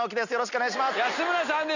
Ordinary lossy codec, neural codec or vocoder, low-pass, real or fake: none; none; 7.2 kHz; real